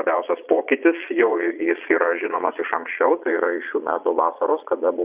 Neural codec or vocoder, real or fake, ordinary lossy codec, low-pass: vocoder, 22.05 kHz, 80 mel bands, Vocos; fake; Opus, 64 kbps; 3.6 kHz